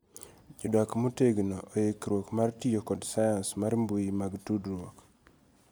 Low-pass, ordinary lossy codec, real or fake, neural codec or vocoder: none; none; real; none